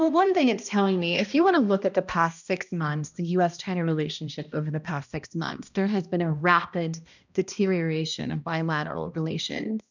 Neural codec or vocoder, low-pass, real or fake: codec, 16 kHz, 1 kbps, X-Codec, HuBERT features, trained on balanced general audio; 7.2 kHz; fake